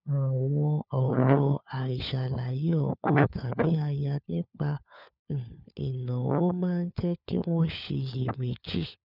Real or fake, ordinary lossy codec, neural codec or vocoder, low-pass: fake; none; codec, 16 kHz, 4 kbps, FunCodec, trained on LibriTTS, 50 frames a second; 5.4 kHz